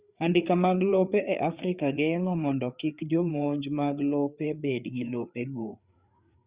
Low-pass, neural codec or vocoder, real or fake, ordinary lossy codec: 3.6 kHz; codec, 16 kHz, 4 kbps, FreqCodec, larger model; fake; Opus, 64 kbps